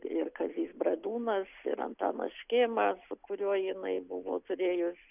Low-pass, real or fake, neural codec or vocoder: 3.6 kHz; real; none